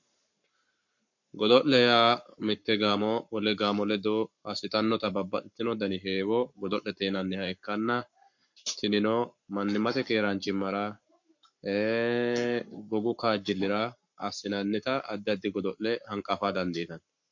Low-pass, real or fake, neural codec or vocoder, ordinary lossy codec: 7.2 kHz; fake; codec, 44.1 kHz, 7.8 kbps, Pupu-Codec; MP3, 48 kbps